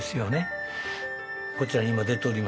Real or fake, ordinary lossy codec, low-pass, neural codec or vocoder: real; none; none; none